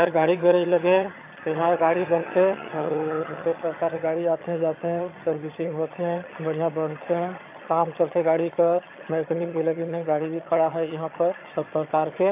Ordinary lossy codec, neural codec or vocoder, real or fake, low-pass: AAC, 24 kbps; vocoder, 22.05 kHz, 80 mel bands, HiFi-GAN; fake; 3.6 kHz